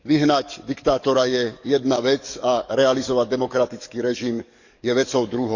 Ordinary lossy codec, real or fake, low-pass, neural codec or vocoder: none; fake; 7.2 kHz; codec, 44.1 kHz, 7.8 kbps, DAC